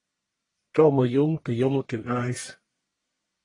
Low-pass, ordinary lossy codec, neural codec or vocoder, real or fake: 10.8 kHz; AAC, 32 kbps; codec, 44.1 kHz, 1.7 kbps, Pupu-Codec; fake